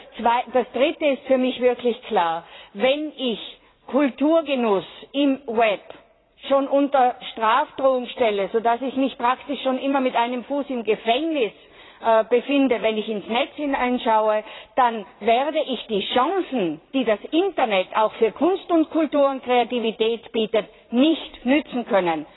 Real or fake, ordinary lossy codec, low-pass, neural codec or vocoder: fake; AAC, 16 kbps; 7.2 kHz; vocoder, 44.1 kHz, 80 mel bands, Vocos